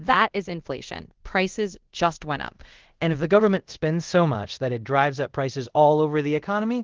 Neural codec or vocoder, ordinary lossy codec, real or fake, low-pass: codec, 24 kHz, 0.5 kbps, DualCodec; Opus, 16 kbps; fake; 7.2 kHz